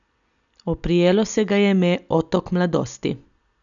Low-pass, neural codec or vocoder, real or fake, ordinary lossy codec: 7.2 kHz; none; real; none